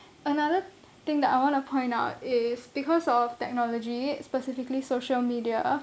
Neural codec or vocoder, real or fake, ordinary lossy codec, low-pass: none; real; none; none